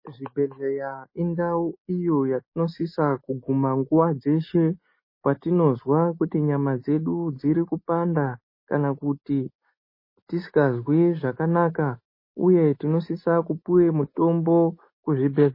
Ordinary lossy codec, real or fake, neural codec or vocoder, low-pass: MP3, 24 kbps; real; none; 5.4 kHz